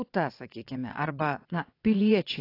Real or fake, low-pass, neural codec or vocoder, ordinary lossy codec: fake; 5.4 kHz; vocoder, 22.05 kHz, 80 mel bands, WaveNeXt; AAC, 32 kbps